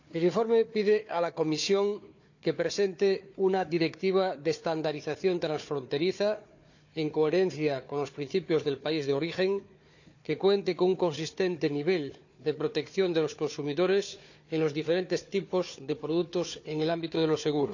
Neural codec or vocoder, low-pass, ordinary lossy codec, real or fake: codec, 16 kHz, 4 kbps, FunCodec, trained on Chinese and English, 50 frames a second; 7.2 kHz; none; fake